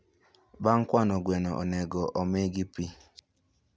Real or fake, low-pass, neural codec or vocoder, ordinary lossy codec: real; none; none; none